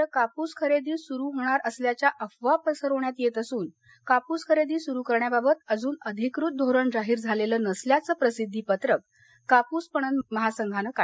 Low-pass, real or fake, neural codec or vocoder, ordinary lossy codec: none; real; none; none